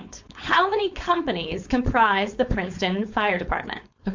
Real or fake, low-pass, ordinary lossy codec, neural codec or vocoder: fake; 7.2 kHz; MP3, 48 kbps; codec, 16 kHz, 4.8 kbps, FACodec